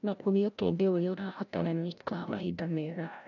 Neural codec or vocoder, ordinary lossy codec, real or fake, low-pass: codec, 16 kHz, 0.5 kbps, FreqCodec, larger model; none; fake; 7.2 kHz